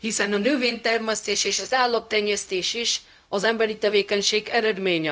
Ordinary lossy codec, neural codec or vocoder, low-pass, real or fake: none; codec, 16 kHz, 0.4 kbps, LongCat-Audio-Codec; none; fake